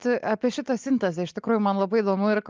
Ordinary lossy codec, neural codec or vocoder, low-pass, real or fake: Opus, 24 kbps; none; 7.2 kHz; real